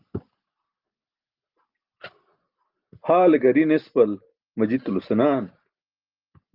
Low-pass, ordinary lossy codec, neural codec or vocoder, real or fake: 5.4 kHz; Opus, 32 kbps; none; real